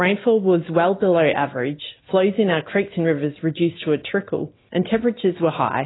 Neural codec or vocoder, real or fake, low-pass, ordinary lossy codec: none; real; 7.2 kHz; AAC, 16 kbps